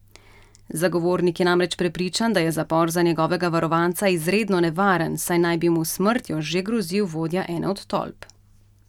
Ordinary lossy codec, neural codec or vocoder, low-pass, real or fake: none; none; 19.8 kHz; real